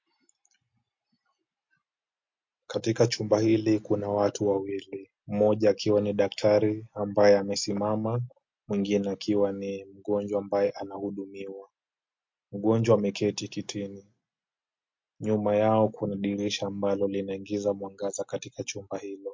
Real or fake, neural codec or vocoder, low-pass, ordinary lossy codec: real; none; 7.2 kHz; MP3, 48 kbps